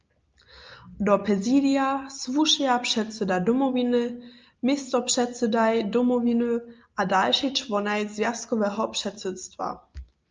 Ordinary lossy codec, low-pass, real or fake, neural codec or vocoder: Opus, 24 kbps; 7.2 kHz; real; none